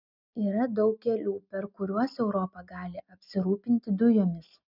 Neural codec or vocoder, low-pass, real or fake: none; 5.4 kHz; real